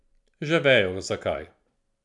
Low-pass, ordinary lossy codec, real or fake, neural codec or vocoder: 10.8 kHz; none; real; none